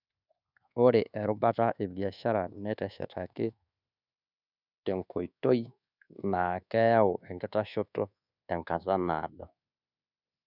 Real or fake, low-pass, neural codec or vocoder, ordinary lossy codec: fake; 5.4 kHz; codec, 24 kHz, 1.2 kbps, DualCodec; none